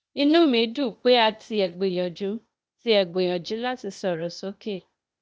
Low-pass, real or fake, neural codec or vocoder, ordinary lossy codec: none; fake; codec, 16 kHz, 0.8 kbps, ZipCodec; none